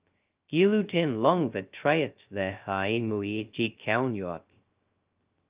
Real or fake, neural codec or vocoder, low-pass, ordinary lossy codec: fake; codec, 16 kHz, 0.2 kbps, FocalCodec; 3.6 kHz; Opus, 24 kbps